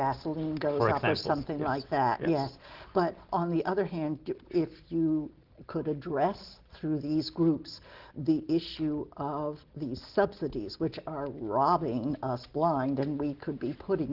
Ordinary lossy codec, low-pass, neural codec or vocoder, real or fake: Opus, 16 kbps; 5.4 kHz; none; real